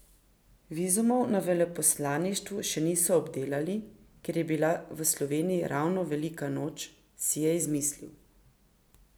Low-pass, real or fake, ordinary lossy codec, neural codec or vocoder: none; real; none; none